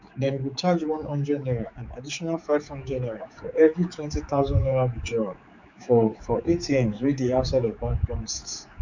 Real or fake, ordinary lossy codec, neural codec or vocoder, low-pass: fake; none; codec, 16 kHz, 4 kbps, X-Codec, HuBERT features, trained on general audio; 7.2 kHz